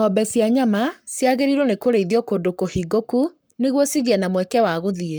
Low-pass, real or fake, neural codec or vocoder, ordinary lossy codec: none; fake; codec, 44.1 kHz, 7.8 kbps, Pupu-Codec; none